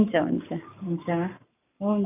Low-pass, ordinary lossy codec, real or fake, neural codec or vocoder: 3.6 kHz; none; fake; codec, 44.1 kHz, 7.8 kbps, Pupu-Codec